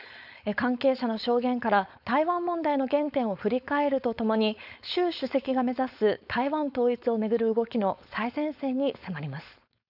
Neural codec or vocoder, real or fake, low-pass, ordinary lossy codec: codec, 16 kHz, 4.8 kbps, FACodec; fake; 5.4 kHz; none